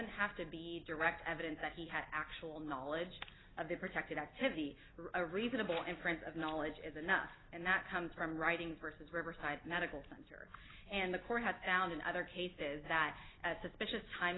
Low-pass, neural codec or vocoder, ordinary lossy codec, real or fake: 7.2 kHz; none; AAC, 16 kbps; real